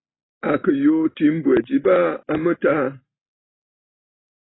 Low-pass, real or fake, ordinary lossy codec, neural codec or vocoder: 7.2 kHz; real; AAC, 16 kbps; none